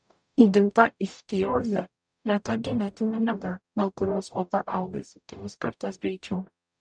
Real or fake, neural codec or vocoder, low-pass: fake; codec, 44.1 kHz, 0.9 kbps, DAC; 9.9 kHz